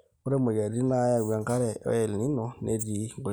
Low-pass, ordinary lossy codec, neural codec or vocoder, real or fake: none; none; none; real